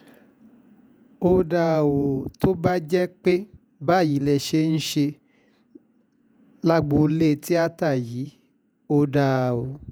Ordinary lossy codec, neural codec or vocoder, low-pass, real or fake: none; vocoder, 48 kHz, 128 mel bands, Vocos; 19.8 kHz; fake